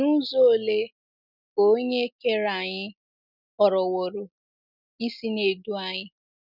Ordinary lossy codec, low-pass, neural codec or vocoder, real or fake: none; 5.4 kHz; none; real